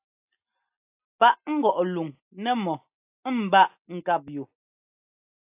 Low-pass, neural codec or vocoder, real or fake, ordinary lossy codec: 3.6 kHz; none; real; AAC, 32 kbps